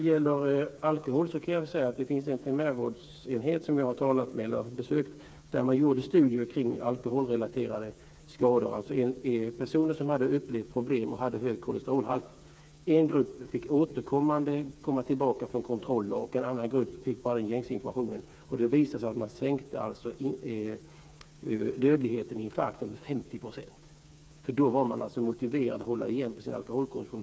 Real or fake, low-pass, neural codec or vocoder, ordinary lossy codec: fake; none; codec, 16 kHz, 4 kbps, FreqCodec, smaller model; none